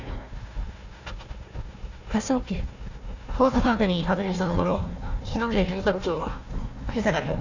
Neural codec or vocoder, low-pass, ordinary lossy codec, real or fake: codec, 16 kHz, 1 kbps, FunCodec, trained on Chinese and English, 50 frames a second; 7.2 kHz; none; fake